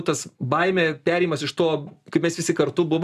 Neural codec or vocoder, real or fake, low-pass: none; real; 14.4 kHz